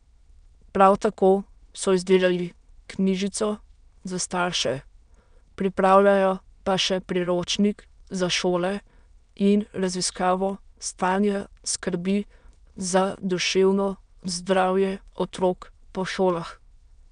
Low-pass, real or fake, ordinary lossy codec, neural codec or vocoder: 9.9 kHz; fake; none; autoencoder, 22.05 kHz, a latent of 192 numbers a frame, VITS, trained on many speakers